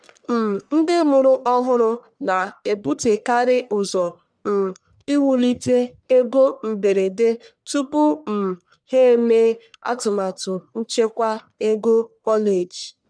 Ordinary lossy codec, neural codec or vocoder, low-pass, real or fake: none; codec, 44.1 kHz, 1.7 kbps, Pupu-Codec; 9.9 kHz; fake